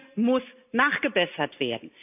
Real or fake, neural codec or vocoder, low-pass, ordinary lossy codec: real; none; 3.6 kHz; none